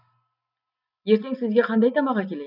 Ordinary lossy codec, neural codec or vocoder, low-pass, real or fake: none; none; 5.4 kHz; real